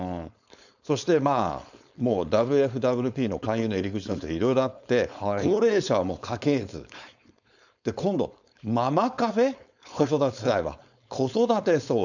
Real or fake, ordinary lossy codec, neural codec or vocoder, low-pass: fake; none; codec, 16 kHz, 4.8 kbps, FACodec; 7.2 kHz